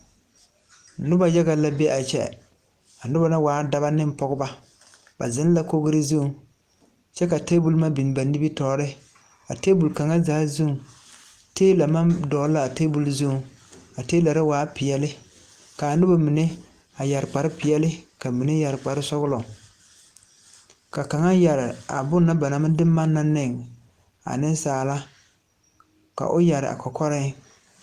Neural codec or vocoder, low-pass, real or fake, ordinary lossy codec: none; 14.4 kHz; real; Opus, 24 kbps